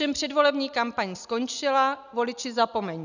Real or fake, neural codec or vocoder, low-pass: real; none; 7.2 kHz